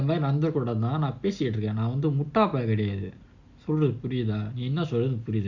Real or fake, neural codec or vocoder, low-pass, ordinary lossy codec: real; none; 7.2 kHz; Opus, 64 kbps